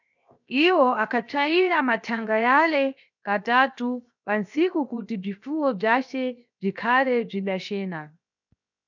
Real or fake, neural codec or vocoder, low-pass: fake; codec, 16 kHz, 0.7 kbps, FocalCodec; 7.2 kHz